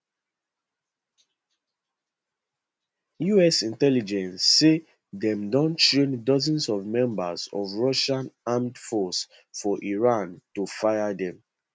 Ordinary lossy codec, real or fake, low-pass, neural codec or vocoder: none; real; none; none